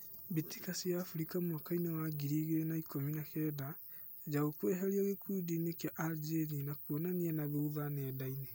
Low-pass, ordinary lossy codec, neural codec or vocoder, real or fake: none; none; none; real